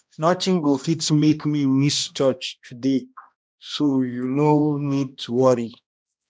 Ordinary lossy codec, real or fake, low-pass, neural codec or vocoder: none; fake; none; codec, 16 kHz, 1 kbps, X-Codec, HuBERT features, trained on balanced general audio